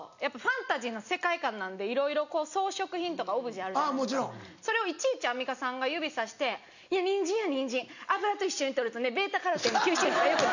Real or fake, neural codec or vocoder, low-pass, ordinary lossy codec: real; none; 7.2 kHz; none